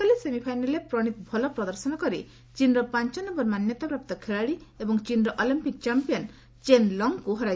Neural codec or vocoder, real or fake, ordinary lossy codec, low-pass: none; real; none; none